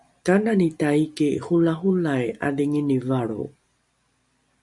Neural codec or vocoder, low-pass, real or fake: none; 10.8 kHz; real